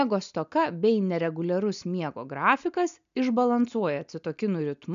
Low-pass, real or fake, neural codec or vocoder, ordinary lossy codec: 7.2 kHz; real; none; MP3, 96 kbps